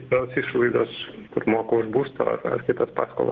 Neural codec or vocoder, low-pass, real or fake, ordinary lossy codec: none; 7.2 kHz; real; Opus, 16 kbps